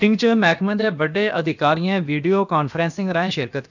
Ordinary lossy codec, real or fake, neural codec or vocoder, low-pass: none; fake; codec, 16 kHz, about 1 kbps, DyCAST, with the encoder's durations; 7.2 kHz